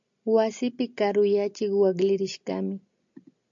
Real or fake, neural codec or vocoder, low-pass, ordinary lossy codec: real; none; 7.2 kHz; AAC, 64 kbps